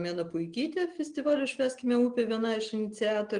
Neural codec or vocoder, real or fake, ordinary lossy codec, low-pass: none; real; Opus, 24 kbps; 9.9 kHz